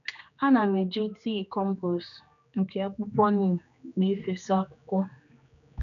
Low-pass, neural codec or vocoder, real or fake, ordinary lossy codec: 7.2 kHz; codec, 16 kHz, 2 kbps, X-Codec, HuBERT features, trained on general audio; fake; none